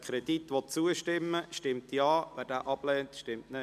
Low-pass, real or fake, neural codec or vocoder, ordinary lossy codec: 14.4 kHz; real; none; MP3, 96 kbps